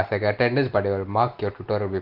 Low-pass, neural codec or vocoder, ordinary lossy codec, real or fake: 5.4 kHz; none; Opus, 16 kbps; real